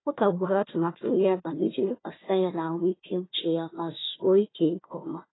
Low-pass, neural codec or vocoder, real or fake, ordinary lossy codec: 7.2 kHz; codec, 16 kHz, 1 kbps, FunCodec, trained on Chinese and English, 50 frames a second; fake; AAC, 16 kbps